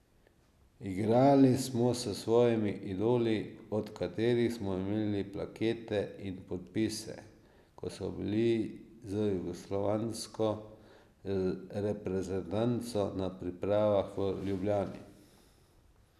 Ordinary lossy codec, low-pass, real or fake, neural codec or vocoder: none; 14.4 kHz; real; none